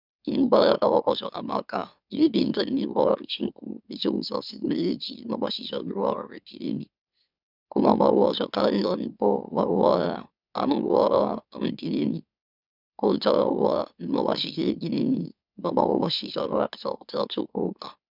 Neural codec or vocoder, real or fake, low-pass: autoencoder, 44.1 kHz, a latent of 192 numbers a frame, MeloTTS; fake; 5.4 kHz